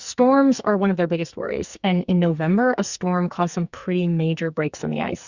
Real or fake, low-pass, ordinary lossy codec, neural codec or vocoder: fake; 7.2 kHz; Opus, 64 kbps; codec, 44.1 kHz, 2.6 kbps, SNAC